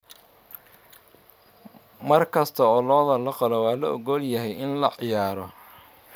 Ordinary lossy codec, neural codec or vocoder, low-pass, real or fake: none; vocoder, 44.1 kHz, 128 mel bands every 512 samples, BigVGAN v2; none; fake